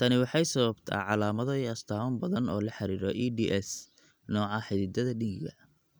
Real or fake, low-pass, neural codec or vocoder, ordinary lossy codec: real; none; none; none